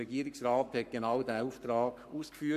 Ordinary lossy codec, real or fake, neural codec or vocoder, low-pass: MP3, 64 kbps; fake; codec, 44.1 kHz, 7.8 kbps, Pupu-Codec; 14.4 kHz